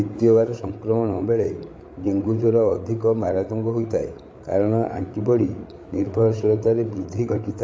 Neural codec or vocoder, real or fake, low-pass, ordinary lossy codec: codec, 16 kHz, 8 kbps, FreqCodec, larger model; fake; none; none